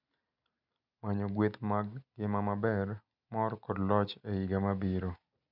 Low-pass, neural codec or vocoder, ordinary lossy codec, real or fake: 5.4 kHz; none; none; real